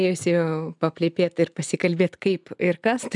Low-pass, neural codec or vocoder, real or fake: 10.8 kHz; none; real